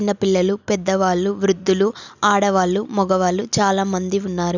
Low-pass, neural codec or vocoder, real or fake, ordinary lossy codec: 7.2 kHz; none; real; none